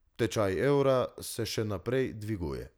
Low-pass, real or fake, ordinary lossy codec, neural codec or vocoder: none; real; none; none